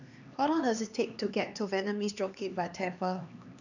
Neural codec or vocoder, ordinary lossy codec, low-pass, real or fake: codec, 16 kHz, 2 kbps, X-Codec, HuBERT features, trained on LibriSpeech; none; 7.2 kHz; fake